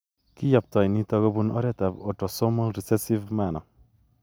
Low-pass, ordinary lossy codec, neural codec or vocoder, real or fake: none; none; none; real